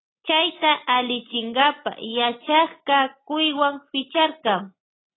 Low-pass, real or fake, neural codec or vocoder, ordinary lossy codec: 7.2 kHz; real; none; AAC, 16 kbps